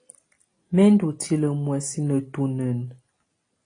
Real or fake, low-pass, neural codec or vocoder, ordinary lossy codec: real; 9.9 kHz; none; AAC, 32 kbps